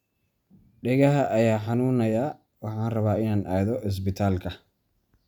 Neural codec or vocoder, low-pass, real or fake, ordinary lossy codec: none; 19.8 kHz; real; none